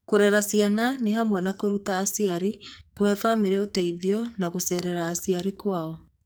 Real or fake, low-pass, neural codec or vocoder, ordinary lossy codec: fake; none; codec, 44.1 kHz, 2.6 kbps, SNAC; none